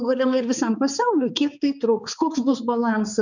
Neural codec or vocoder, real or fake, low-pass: codec, 16 kHz, 4 kbps, X-Codec, HuBERT features, trained on balanced general audio; fake; 7.2 kHz